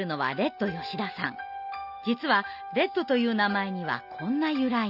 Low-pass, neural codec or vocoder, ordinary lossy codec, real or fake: 5.4 kHz; none; none; real